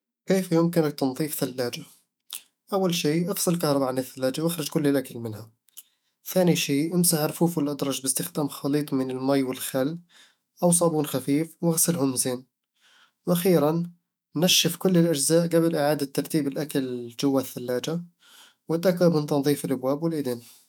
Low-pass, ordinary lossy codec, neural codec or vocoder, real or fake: none; none; autoencoder, 48 kHz, 128 numbers a frame, DAC-VAE, trained on Japanese speech; fake